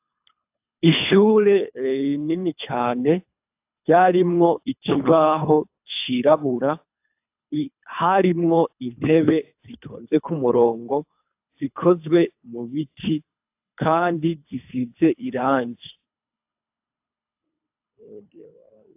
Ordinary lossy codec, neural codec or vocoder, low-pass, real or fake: AAC, 32 kbps; codec, 24 kHz, 3 kbps, HILCodec; 3.6 kHz; fake